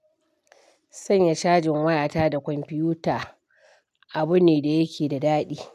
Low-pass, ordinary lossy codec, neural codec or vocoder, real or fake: 14.4 kHz; none; none; real